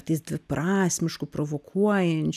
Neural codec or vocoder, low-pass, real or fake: none; 14.4 kHz; real